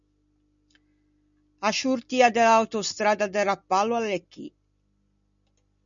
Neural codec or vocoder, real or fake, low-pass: none; real; 7.2 kHz